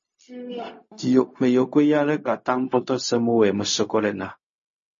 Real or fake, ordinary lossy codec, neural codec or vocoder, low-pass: fake; MP3, 32 kbps; codec, 16 kHz, 0.4 kbps, LongCat-Audio-Codec; 7.2 kHz